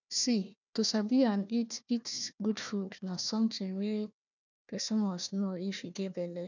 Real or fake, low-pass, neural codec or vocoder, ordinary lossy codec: fake; 7.2 kHz; codec, 16 kHz, 1 kbps, FunCodec, trained on Chinese and English, 50 frames a second; none